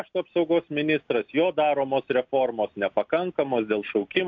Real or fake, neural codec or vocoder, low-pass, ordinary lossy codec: real; none; 7.2 kHz; AAC, 48 kbps